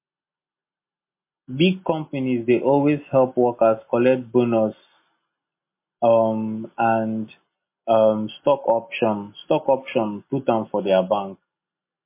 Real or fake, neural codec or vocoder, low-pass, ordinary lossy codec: real; none; 3.6 kHz; MP3, 24 kbps